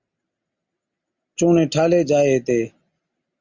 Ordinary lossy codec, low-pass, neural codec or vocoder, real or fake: Opus, 64 kbps; 7.2 kHz; none; real